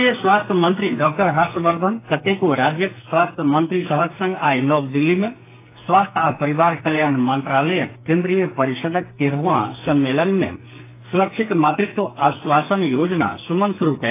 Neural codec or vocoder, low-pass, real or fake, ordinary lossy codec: codec, 44.1 kHz, 2.6 kbps, SNAC; 3.6 kHz; fake; AAC, 24 kbps